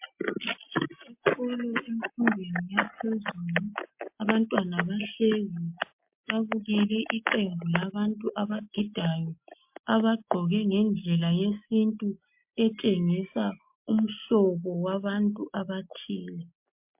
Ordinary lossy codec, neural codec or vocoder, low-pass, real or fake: MP3, 32 kbps; none; 3.6 kHz; real